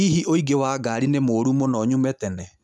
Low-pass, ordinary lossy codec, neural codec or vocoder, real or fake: none; none; none; real